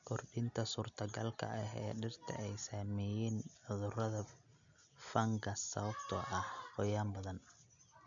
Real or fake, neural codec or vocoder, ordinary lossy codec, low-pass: real; none; none; 7.2 kHz